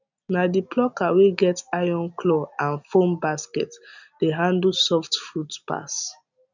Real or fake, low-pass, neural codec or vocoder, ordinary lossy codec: real; 7.2 kHz; none; none